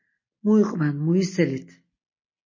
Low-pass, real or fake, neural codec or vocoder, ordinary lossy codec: 7.2 kHz; real; none; MP3, 32 kbps